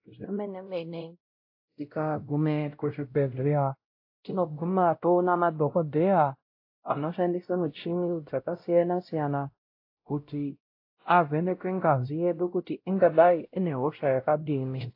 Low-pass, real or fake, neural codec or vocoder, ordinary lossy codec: 5.4 kHz; fake; codec, 16 kHz, 0.5 kbps, X-Codec, WavLM features, trained on Multilingual LibriSpeech; AAC, 32 kbps